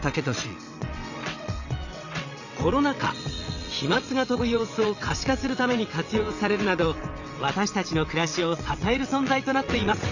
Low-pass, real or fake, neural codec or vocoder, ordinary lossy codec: 7.2 kHz; fake; vocoder, 22.05 kHz, 80 mel bands, WaveNeXt; none